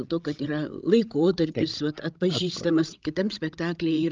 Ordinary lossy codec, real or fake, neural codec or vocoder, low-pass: Opus, 24 kbps; fake; codec, 16 kHz, 16 kbps, FreqCodec, larger model; 7.2 kHz